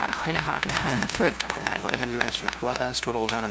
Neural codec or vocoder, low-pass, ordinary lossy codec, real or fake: codec, 16 kHz, 0.5 kbps, FunCodec, trained on LibriTTS, 25 frames a second; none; none; fake